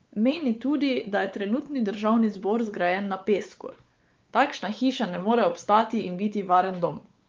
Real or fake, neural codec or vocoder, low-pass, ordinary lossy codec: fake; codec, 16 kHz, 4 kbps, X-Codec, WavLM features, trained on Multilingual LibriSpeech; 7.2 kHz; Opus, 32 kbps